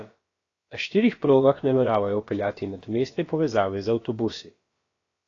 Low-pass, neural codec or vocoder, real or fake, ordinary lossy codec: 7.2 kHz; codec, 16 kHz, about 1 kbps, DyCAST, with the encoder's durations; fake; AAC, 32 kbps